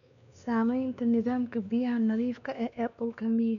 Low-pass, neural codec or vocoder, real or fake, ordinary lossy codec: 7.2 kHz; codec, 16 kHz, 2 kbps, X-Codec, WavLM features, trained on Multilingual LibriSpeech; fake; none